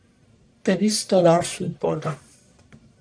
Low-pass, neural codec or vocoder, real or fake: 9.9 kHz; codec, 44.1 kHz, 1.7 kbps, Pupu-Codec; fake